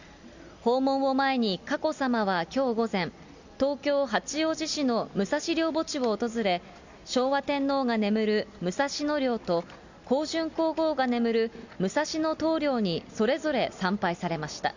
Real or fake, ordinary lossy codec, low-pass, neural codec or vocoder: real; Opus, 64 kbps; 7.2 kHz; none